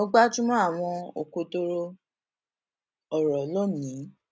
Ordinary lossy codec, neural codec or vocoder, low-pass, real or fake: none; none; none; real